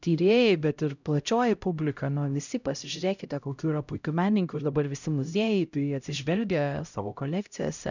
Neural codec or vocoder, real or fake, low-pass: codec, 16 kHz, 0.5 kbps, X-Codec, HuBERT features, trained on LibriSpeech; fake; 7.2 kHz